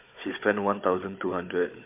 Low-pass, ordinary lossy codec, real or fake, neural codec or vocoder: 3.6 kHz; MP3, 32 kbps; fake; codec, 16 kHz, 16 kbps, FunCodec, trained on Chinese and English, 50 frames a second